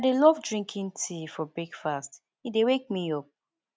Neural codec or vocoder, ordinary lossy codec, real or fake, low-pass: none; none; real; none